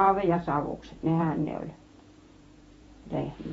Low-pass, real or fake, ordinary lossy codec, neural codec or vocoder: 19.8 kHz; fake; AAC, 24 kbps; vocoder, 48 kHz, 128 mel bands, Vocos